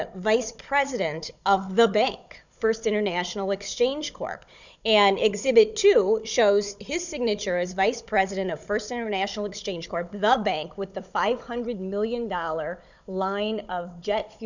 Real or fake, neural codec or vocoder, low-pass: fake; codec, 16 kHz, 16 kbps, FunCodec, trained on Chinese and English, 50 frames a second; 7.2 kHz